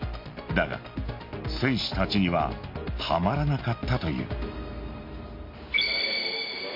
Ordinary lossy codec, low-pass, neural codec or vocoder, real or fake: MP3, 32 kbps; 5.4 kHz; none; real